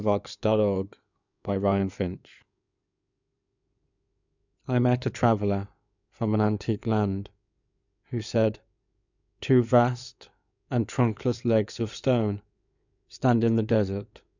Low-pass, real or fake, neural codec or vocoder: 7.2 kHz; fake; codec, 16 kHz in and 24 kHz out, 2.2 kbps, FireRedTTS-2 codec